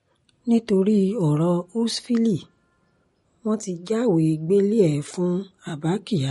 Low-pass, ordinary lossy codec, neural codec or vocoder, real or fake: 19.8 kHz; MP3, 48 kbps; none; real